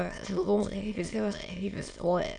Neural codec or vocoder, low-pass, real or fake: autoencoder, 22.05 kHz, a latent of 192 numbers a frame, VITS, trained on many speakers; 9.9 kHz; fake